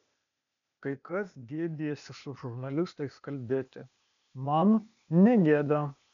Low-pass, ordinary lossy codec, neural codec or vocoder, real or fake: 7.2 kHz; MP3, 96 kbps; codec, 16 kHz, 0.8 kbps, ZipCodec; fake